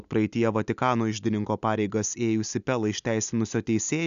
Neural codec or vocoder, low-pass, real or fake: none; 7.2 kHz; real